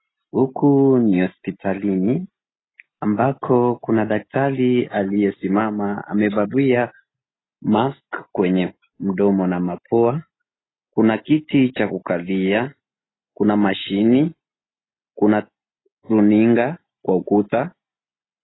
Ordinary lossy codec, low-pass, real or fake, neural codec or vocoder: AAC, 16 kbps; 7.2 kHz; real; none